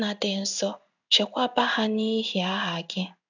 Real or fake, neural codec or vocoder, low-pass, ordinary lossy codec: fake; codec, 16 kHz in and 24 kHz out, 1 kbps, XY-Tokenizer; 7.2 kHz; none